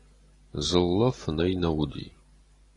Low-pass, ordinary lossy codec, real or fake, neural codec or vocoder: 10.8 kHz; AAC, 32 kbps; real; none